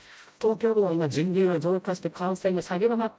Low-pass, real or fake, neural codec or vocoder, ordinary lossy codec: none; fake; codec, 16 kHz, 0.5 kbps, FreqCodec, smaller model; none